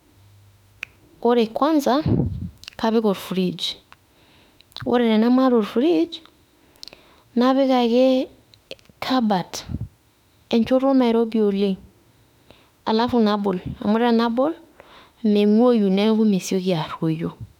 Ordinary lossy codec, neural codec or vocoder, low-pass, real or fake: none; autoencoder, 48 kHz, 32 numbers a frame, DAC-VAE, trained on Japanese speech; 19.8 kHz; fake